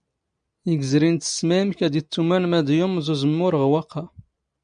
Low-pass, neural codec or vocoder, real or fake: 9.9 kHz; none; real